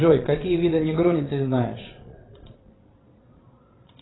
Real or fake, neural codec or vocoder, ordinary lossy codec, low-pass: fake; vocoder, 22.05 kHz, 80 mel bands, Vocos; AAC, 16 kbps; 7.2 kHz